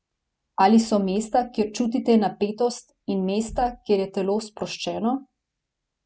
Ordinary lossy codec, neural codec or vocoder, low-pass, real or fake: none; none; none; real